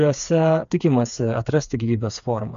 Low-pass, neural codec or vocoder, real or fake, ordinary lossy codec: 7.2 kHz; codec, 16 kHz, 4 kbps, FreqCodec, smaller model; fake; AAC, 96 kbps